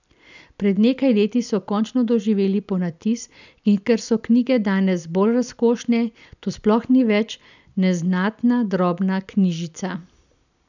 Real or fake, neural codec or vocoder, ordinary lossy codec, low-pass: real; none; none; 7.2 kHz